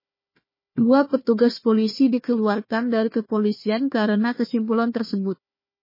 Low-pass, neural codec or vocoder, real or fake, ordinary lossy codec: 5.4 kHz; codec, 16 kHz, 1 kbps, FunCodec, trained on Chinese and English, 50 frames a second; fake; MP3, 24 kbps